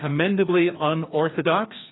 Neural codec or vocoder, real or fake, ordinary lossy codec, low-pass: codec, 16 kHz, 2 kbps, FreqCodec, larger model; fake; AAC, 16 kbps; 7.2 kHz